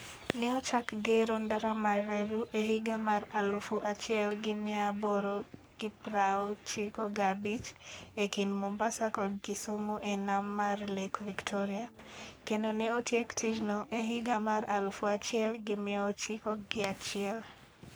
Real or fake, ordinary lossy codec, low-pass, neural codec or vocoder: fake; none; none; codec, 44.1 kHz, 3.4 kbps, Pupu-Codec